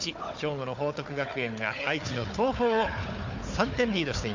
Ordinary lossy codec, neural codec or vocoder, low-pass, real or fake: MP3, 64 kbps; codec, 16 kHz, 4 kbps, FunCodec, trained on Chinese and English, 50 frames a second; 7.2 kHz; fake